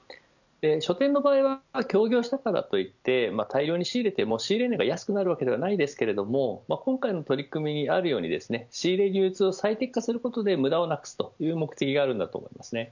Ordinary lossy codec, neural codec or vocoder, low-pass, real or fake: none; none; 7.2 kHz; real